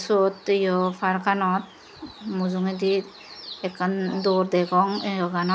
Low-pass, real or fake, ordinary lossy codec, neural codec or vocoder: none; real; none; none